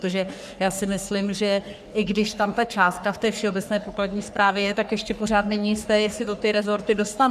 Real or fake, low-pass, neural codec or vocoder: fake; 14.4 kHz; codec, 44.1 kHz, 3.4 kbps, Pupu-Codec